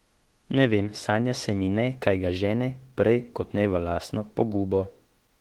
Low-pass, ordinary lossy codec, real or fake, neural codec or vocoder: 19.8 kHz; Opus, 16 kbps; fake; autoencoder, 48 kHz, 32 numbers a frame, DAC-VAE, trained on Japanese speech